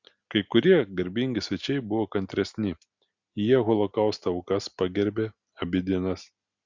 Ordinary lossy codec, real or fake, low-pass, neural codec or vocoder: Opus, 64 kbps; real; 7.2 kHz; none